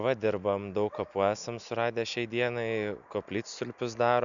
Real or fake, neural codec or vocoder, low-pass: real; none; 7.2 kHz